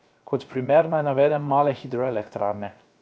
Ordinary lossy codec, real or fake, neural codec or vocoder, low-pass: none; fake; codec, 16 kHz, 0.7 kbps, FocalCodec; none